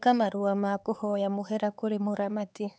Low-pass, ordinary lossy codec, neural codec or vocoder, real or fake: none; none; codec, 16 kHz, 4 kbps, X-Codec, HuBERT features, trained on LibriSpeech; fake